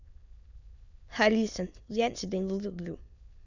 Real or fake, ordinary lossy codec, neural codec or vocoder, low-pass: fake; none; autoencoder, 22.05 kHz, a latent of 192 numbers a frame, VITS, trained on many speakers; 7.2 kHz